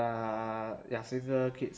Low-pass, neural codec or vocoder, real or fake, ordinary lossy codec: none; none; real; none